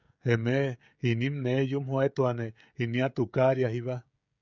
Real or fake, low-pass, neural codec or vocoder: fake; 7.2 kHz; codec, 16 kHz, 16 kbps, FreqCodec, smaller model